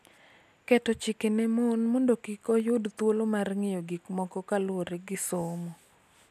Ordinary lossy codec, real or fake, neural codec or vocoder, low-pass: none; real; none; 14.4 kHz